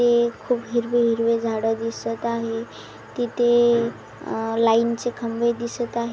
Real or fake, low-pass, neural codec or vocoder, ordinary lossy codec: real; none; none; none